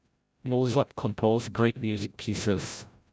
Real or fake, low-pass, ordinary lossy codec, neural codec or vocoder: fake; none; none; codec, 16 kHz, 0.5 kbps, FreqCodec, larger model